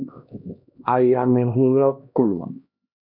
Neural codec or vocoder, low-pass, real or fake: codec, 16 kHz, 1 kbps, X-Codec, HuBERT features, trained on LibriSpeech; 5.4 kHz; fake